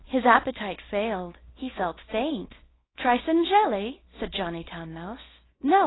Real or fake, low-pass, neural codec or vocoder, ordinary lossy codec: fake; 7.2 kHz; codec, 24 kHz, 0.9 kbps, WavTokenizer, small release; AAC, 16 kbps